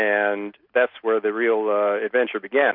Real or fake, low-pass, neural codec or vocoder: real; 5.4 kHz; none